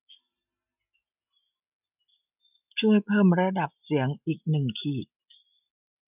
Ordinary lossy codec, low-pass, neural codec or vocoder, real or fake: none; 3.6 kHz; none; real